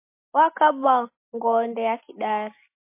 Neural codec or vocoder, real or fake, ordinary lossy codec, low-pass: none; real; MP3, 24 kbps; 3.6 kHz